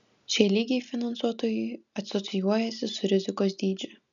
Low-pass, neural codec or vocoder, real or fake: 7.2 kHz; none; real